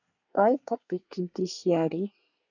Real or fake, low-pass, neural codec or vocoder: fake; 7.2 kHz; codec, 24 kHz, 1 kbps, SNAC